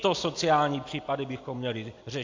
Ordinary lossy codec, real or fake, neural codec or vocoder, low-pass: AAC, 48 kbps; real; none; 7.2 kHz